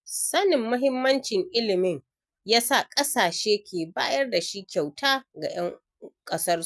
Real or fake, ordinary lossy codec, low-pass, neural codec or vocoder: real; none; none; none